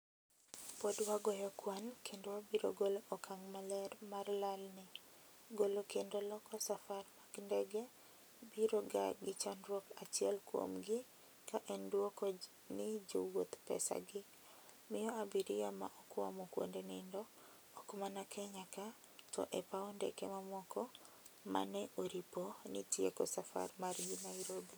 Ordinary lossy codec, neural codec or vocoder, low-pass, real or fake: none; none; none; real